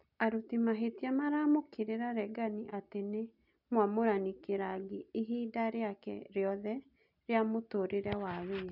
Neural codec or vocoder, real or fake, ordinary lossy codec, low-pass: none; real; none; 5.4 kHz